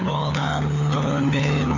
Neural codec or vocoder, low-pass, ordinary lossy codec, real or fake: codec, 16 kHz, 4 kbps, FunCodec, trained on LibriTTS, 50 frames a second; 7.2 kHz; none; fake